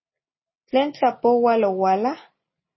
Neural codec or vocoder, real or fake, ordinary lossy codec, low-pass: none; real; MP3, 24 kbps; 7.2 kHz